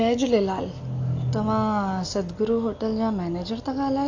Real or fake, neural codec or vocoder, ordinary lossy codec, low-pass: real; none; AAC, 48 kbps; 7.2 kHz